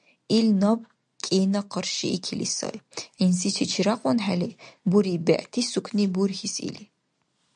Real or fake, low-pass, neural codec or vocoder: real; 9.9 kHz; none